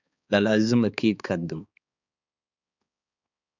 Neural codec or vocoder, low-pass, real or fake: codec, 16 kHz, 4 kbps, X-Codec, HuBERT features, trained on balanced general audio; 7.2 kHz; fake